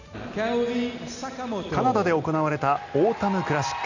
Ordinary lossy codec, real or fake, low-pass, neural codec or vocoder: none; real; 7.2 kHz; none